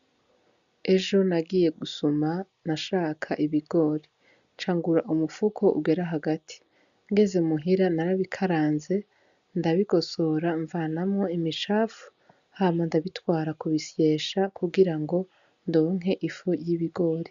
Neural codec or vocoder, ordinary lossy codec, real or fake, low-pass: none; MP3, 96 kbps; real; 7.2 kHz